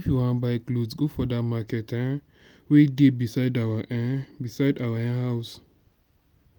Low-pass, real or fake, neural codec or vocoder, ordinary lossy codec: none; real; none; none